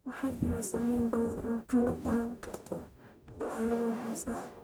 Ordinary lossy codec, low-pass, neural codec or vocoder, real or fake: none; none; codec, 44.1 kHz, 0.9 kbps, DAC; fake